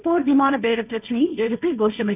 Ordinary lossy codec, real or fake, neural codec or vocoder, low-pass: none; fake; codec, 16 kHz, 1.1 kbps, Voila-Tokenizer; 3.6 kHz